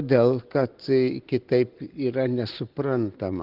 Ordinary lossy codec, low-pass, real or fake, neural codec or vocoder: Opus, 16 kbps; 5.4 kHz; real; none